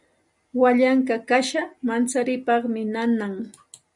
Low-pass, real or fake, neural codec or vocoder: 10.8 kHz; real; none